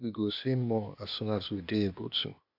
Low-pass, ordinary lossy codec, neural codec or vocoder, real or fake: 5.4 kHz; AAC, 48 kbps; codec, 16 kHz, 0.8 kbps, ZipCodec; fake